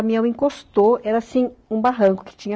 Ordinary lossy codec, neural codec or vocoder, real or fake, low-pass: none; none; real; none